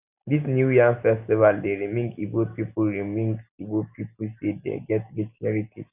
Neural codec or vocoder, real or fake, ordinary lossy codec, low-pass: none; real; none; 3.6 kHz